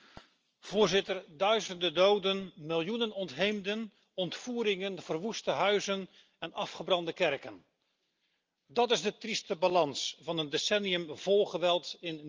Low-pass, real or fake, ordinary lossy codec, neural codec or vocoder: 7.2 kHz; real; Opus, 24 kbps; none